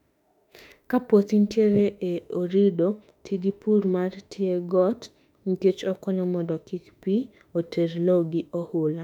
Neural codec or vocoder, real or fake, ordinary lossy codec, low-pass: autoencoder, 48 kHz, 32 numbers a frame, DAC-VAE, trained on Japanese speech; fake; none; 19.8 kHz